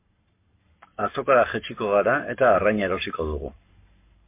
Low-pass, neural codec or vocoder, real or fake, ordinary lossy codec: 3.6 kHz; codec, 44.1 kHz, 7.8 kbps, Pupu-Codec; fake; MP3, 24 kbps